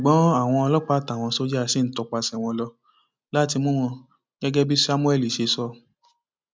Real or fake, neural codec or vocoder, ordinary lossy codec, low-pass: real; none; none; none